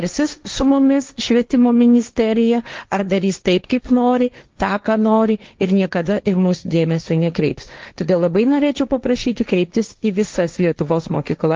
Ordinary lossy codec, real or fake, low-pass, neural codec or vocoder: Opus, 32 kbps; fake; 7.2 kHz; codec, 16 kHz, 1.1 kbps, Voila-Tokenizer